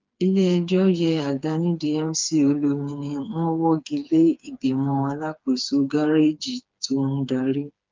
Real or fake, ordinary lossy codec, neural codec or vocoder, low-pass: fake; Opus, 24 kbps; codec, 16 kHz, 4 kbps, FreqCodec, smaller model; 7.2 kHz